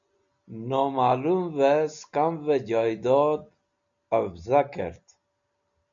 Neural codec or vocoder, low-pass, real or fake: none; 7.2 kHz; real